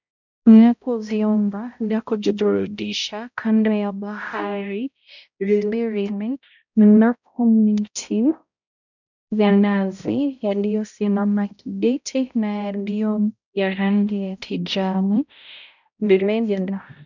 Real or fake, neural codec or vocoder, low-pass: fake; codec, 16 kHz, 0.5 kbps, X-Codec, HuBERT features, trained on balanced general audio; 7.2 kHz